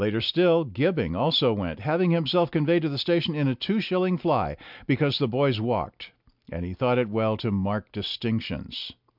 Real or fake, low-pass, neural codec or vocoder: real; 5.4 kHz; none